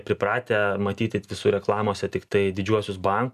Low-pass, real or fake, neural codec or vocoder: 14.4 kHz; real; none